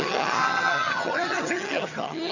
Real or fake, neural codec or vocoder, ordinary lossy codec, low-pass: fake; vocoder, 22.05 kHz, 80 mel bands, HiFi-GAN; none; 7.2 kHz